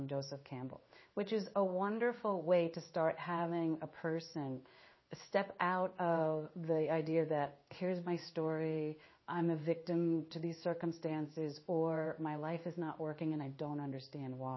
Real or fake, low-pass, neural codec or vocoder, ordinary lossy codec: fake; 7.2 kHz; codec, 16 kHz in and 24 kHz out, 1 kbps, XY-Tokenizer; MP3, 24 kbps